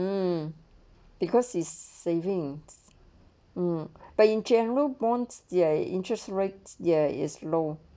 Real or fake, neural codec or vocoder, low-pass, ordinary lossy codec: real; none; none; none